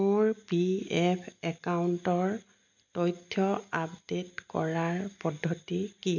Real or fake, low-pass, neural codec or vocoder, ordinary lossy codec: real; 7.2 kHz; none; none